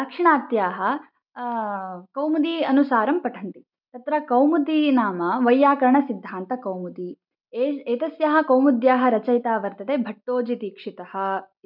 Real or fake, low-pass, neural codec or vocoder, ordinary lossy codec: real; 5.4 kHz; none; none